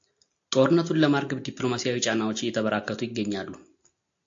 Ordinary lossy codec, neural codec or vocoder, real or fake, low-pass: AAC, 48 kbps; none; real; 7.2 kHz